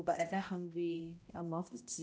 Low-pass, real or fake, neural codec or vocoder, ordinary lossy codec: none; fake; codec, 16 kHz, 0.5 kbps, X-Codec, HuBERT features, trained on balanced general audio; none